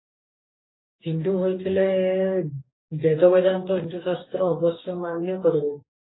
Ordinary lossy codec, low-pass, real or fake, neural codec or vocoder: AAC, 16 kbps; 7.2 kHz; fake; codec, 44.1 kHz, 2.6 kbps, DAC